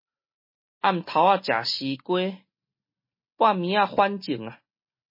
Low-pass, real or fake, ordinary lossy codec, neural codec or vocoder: 5.4 kHz; real; MP3, 24 kbps; none